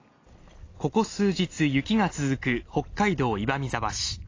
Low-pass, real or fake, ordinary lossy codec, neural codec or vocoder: 7.2 kHz; real; AAC, 32 kbps; none